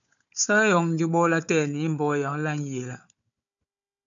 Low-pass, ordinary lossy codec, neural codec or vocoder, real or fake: 7.2 kHz; MP3, 96 kbps; codec, 16 kHz, 4 kbps, FunCodec, trained on Chinese and English, 50 frames a second; fake